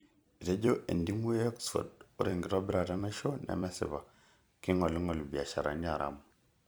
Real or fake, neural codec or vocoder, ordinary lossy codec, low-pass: fake; vocoder, 44.1 kHz, 128 mel bands every 256 samples, BigVGAN v2; none; none